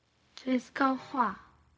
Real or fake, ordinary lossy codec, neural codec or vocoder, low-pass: fake; none; codec, 16 kHz, 0.4 kbps, LongCat-Audio-Codec; none